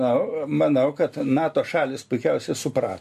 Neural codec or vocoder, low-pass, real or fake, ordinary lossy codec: none; 14.4 kHz; real; MP3, 64 kbps